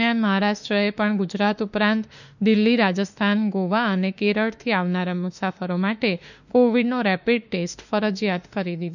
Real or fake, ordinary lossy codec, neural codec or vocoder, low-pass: fake; none; autoencoder, 48 kHz, 32 numbers a frame, DAC-VAE, trained on Japanese speech; 7.2 kHz